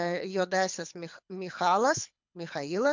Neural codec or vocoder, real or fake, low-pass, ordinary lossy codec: codec, 24 kHz, 6 kbps, HILCodec; fake; 7.2 kHz; MP3, 64 kbps